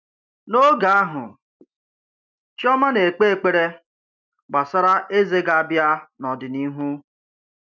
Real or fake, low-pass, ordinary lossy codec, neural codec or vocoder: real; 7.2 kHz; none; none